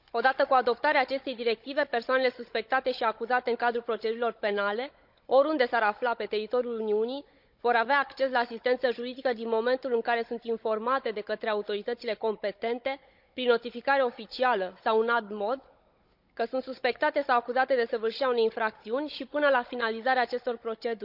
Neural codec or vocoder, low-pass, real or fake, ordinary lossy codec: codec, 16 kHz, 16 kbps, FunCodec, trained on Chinese and English, 50 frames a second; 5.4 kHz; fake; none